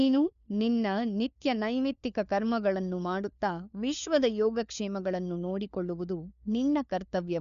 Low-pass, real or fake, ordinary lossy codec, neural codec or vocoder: 7.2 kHz; fake; none; codec, 16 kHz, 4 kbps, FunCodec, trained on LibriTTS, 50 frames a second